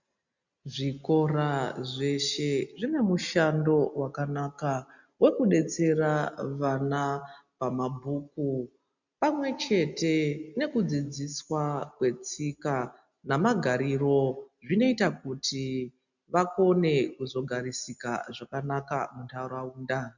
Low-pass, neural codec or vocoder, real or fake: 7.2 kHz; none; real